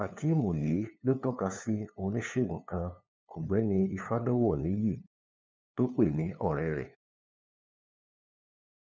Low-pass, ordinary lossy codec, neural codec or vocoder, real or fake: none; none; codec, 16 kHz, 2 kbps, FunCodec, trained on LibriTTS, 25 frames a second; fake